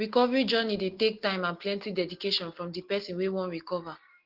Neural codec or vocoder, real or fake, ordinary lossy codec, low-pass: none; real; Opus, 16 kbps; 5.4 kHz